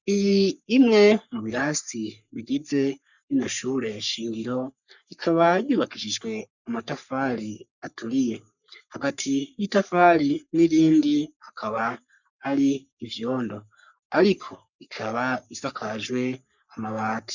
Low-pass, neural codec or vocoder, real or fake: 7.2 kHz; codec, 44.1 kHz, 3.4 kbps, Pupu-Codec; fake